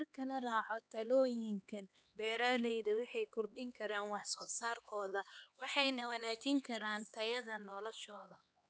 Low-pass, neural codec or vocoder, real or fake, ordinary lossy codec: none; codec, 16 kHz, 2 kbps, X-Codec, HuBERT features, trained on LibriSpeech; fake; none